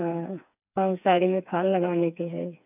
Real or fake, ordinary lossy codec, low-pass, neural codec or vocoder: fake; none; 3.6 kHz; codec, 16 kHz, 4 kbps, FreqCodec, smaller model